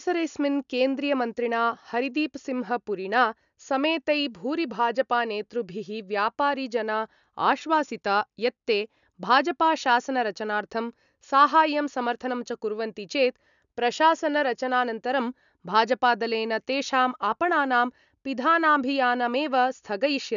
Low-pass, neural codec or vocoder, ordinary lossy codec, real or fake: 7.2 kHz; none; none; real